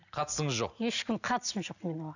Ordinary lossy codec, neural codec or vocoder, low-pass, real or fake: none; none; 7.2 kHz; real